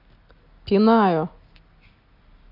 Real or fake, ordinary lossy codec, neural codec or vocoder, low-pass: real; AAC, 32 kbps; none; 5.4 kHz